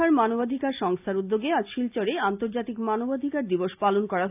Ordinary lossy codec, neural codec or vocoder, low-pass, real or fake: AAC, 32 kbps; none; 3.6 kHz; real